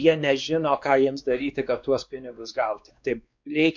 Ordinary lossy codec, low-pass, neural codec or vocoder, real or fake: MP3, 64 kbps; 7.2 kHz; codec, 16 kHz, 2 kbps, X-Codec, WavLM features, trained on Multilingual LibriSpeech; fake